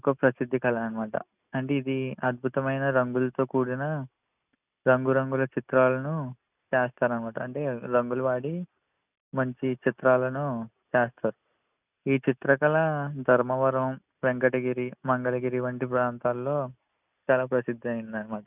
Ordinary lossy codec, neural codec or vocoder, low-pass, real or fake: none; none; 3.6 kHz; real